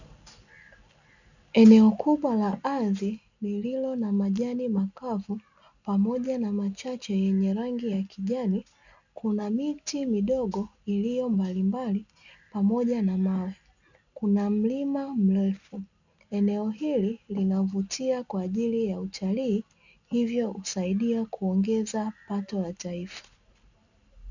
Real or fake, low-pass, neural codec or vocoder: real; 7.2 kHz; none